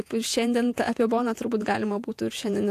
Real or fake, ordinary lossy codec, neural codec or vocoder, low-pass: fake; AAC, 64 kbps; vocoder, 48 kHz, 128 mel bands, Vocos; 14.4 kHz